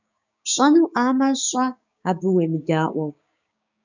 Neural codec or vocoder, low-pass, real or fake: codec, 16 kHz, 6 kbps, DAC; 7.2 kHz; fake